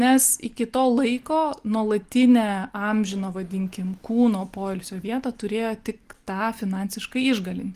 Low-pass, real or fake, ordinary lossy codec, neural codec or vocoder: 14.4 kHz; real; Opus, 24 kbps; none